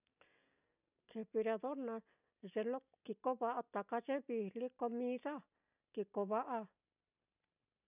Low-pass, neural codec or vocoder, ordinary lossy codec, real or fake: 3.6 kHz; none; none; real